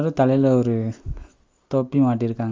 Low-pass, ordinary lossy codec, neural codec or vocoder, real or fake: 7.2 kHz; Opus, 32 kbps; none; real